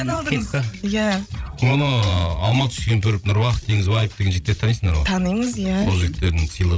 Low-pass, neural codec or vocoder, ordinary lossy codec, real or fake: none; codec, 16 kHz, 16 kbps, FreqCodec, larger model; none; fake